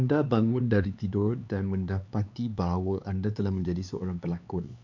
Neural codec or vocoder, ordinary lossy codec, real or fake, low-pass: codec, 16 kHz, 4 kbps, X-Codec, HuBERT features, trained on LibriSpeech; none; fake; 7.2 kHz